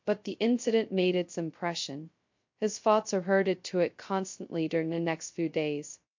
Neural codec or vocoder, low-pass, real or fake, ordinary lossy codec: codec, 16 kHz, 0.2 kbps, FocalCodec; 7.2 kHz; fake; MP3, 48 kbps